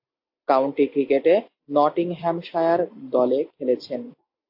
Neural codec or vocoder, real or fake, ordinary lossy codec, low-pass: none; real; AAC, 48 kbps; 5.4 kHz